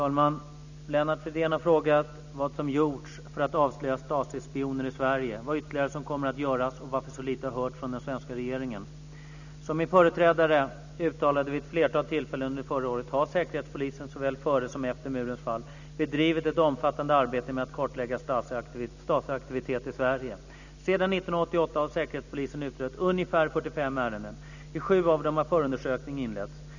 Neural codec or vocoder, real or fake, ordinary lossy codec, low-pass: none; real; none; 7.2 kHz